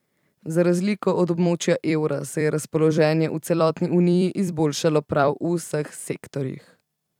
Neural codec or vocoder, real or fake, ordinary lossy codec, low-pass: vocoder, 44.1 kHz, 128 mel bands every 256 samples, BigVGAN v2; fake; none; 19.8 kHz